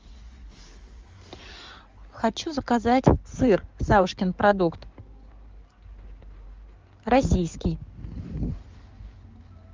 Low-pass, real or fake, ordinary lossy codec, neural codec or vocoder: 7.2 kHz; fake; Opus, 32 kbps; codec, 16 kHz in and 24 kHz out, 2.2 kbps, FireRedTTS-2 codec